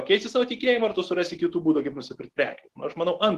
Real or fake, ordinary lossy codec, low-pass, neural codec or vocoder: real; Opus, 16 kbps; 14.4 kHz; none